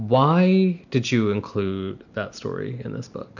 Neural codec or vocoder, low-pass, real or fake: none; 7.2 kHz; real